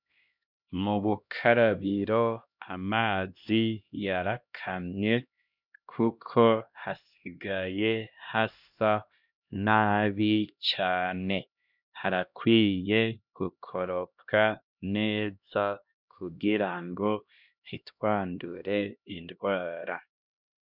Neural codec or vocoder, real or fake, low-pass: codec, 16 kHz, 1 kbps, X-Codec, HuBERT features, trained on LibriSpeech; fake; 5.4 kHz